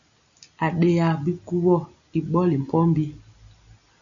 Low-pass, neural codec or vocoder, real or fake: 7.2 kHz; none; real